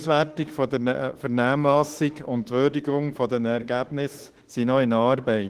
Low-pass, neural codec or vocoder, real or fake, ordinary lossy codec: 14.4 kHz; autoencoder, 48 kHz, 32 numbers a frame, DAC-VAE, trained on Japanese speech; fake; Opus, 16 kbps